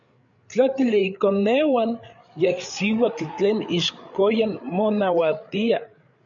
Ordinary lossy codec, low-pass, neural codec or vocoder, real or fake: MP3, 96 kbps; 7.2 kHz; codec, 16 kHz, 8 kbps, FreqCodec, larger model; fake